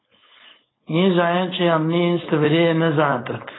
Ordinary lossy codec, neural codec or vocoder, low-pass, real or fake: AAC, 16 kbps; codec, 16 kHz, 4.8 kbps, FACodec; 7.2 kHz; fake